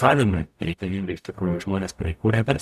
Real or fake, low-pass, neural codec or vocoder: fake; 14.4 kHz; codec, 44.1 kHz, 0.9 kbps, DAC